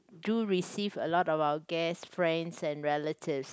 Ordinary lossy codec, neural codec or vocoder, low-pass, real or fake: none; none; none; real